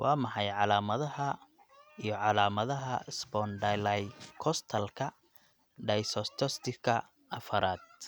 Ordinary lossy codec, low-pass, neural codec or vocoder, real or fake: none; none; none; real